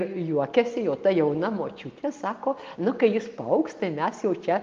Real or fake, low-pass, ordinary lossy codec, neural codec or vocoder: real; 7.2 kHz; Opus, 32 kbps; none